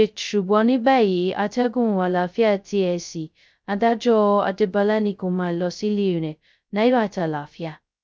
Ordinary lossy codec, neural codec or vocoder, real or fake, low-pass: none; codec, 16 kHz, 0.2 kbps, FocalCodec; fake; none